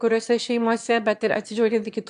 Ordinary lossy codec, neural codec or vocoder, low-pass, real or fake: AAC, 64 kbps; autoencoder, 22.05 kHz, a latent of 192 numbers a frame, VITS, trained on one speaker; 9.9 kHz; fake